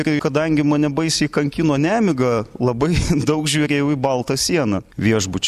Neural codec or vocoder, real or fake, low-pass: none; real; 14.4 kHz